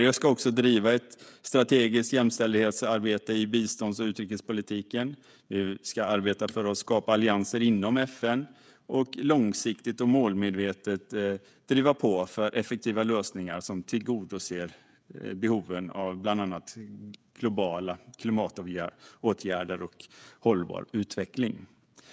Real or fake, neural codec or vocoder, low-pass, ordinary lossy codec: fake; codec, 16 kHz, 16 kbps, FreqCodec, smaller model; none; none